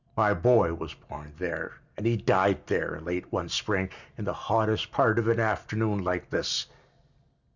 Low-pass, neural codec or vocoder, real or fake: 7.2 kHz; none; real